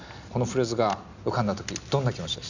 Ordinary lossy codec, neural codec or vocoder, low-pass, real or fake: none; none; 7.2 kHz; real